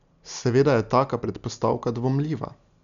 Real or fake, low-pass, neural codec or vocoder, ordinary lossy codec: real; 7.2 kHz; none; none